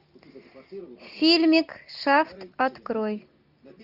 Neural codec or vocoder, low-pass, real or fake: none; 5.4 kHz; real